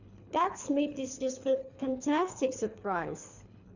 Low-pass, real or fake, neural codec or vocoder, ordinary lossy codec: 7.2 kHz; fake; codec, 24 kHz, 3 kbps, HILCodec; none